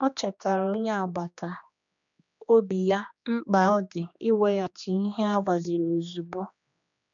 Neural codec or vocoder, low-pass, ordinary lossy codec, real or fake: codec, 16 kHz, 2 kbps, X-Codec, HuBERT features, trained on general audio; 7.2 kHz; MP3, 96 kbps; fake